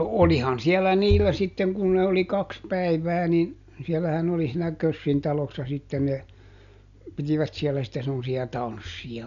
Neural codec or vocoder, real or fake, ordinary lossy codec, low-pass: none; real; AAC, 96 kbps; 7.2 kHz